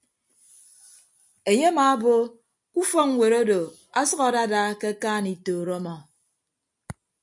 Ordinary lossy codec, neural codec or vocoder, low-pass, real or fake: MP3, 64 kbps; none; 10.8 kHz; real